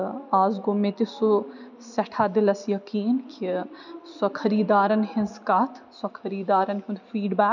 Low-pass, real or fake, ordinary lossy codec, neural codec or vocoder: 7.2 kHz; real; none; none